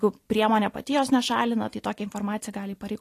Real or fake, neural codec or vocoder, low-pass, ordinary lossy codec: real; none; 14.4 kHz; AAC, 48 kbps